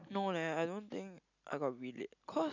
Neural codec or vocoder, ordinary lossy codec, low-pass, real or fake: none; none; 7.2 kHz; real